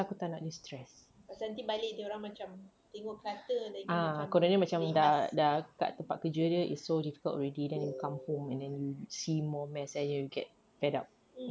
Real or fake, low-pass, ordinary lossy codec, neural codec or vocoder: real; none; none; none